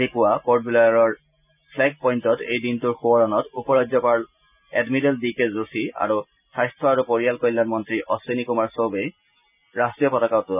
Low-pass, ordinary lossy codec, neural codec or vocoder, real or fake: 3.6 kHz; none; none; real